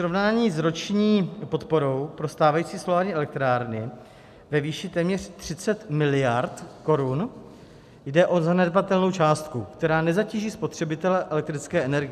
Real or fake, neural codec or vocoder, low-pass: real; none; 14.4 kHz